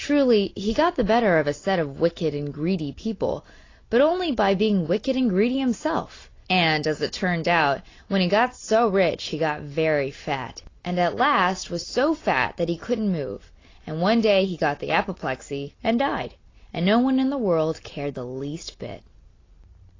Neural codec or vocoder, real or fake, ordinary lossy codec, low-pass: none; real; AAC, 32 kbps; 7.2 kHz